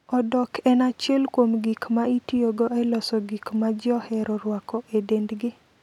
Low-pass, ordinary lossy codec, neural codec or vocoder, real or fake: 19.8 kHz; none; none; real